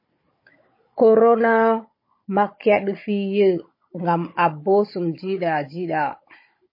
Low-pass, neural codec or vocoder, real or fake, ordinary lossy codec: 5.4 kHz; codec, 16 kHz, 16 kbps, FunCodec, trained on Chinese and English, 50 frames a second; fake; MP3, 24 kbps